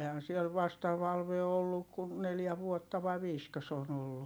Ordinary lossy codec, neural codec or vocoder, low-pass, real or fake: none; none; none; real